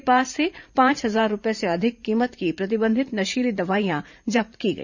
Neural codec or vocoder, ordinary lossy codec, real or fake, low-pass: vocoder, 44.1 kHz, 128 mel bands every 512 samples, BigVGAN v2; none; fake; 7.2 kHz